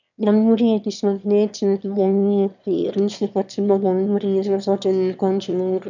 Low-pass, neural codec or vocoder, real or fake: 7.2 kHz; autoencoder, 22.05 kHz, a latent of 192 numbers a frame, VITS, trained on one speaker; fake